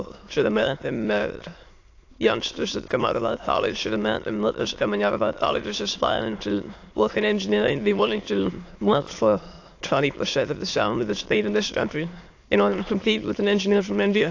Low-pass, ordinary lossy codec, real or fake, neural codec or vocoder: 7.2 kHz; AAC, 48 kbps; fake; autoencoder, 22.05 kHz, a latent of 192 numbers a frame, VITS, trained on many speakers